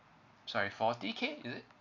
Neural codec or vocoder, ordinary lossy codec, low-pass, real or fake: none; MP3, 48 kbps; 7.2 kHz; real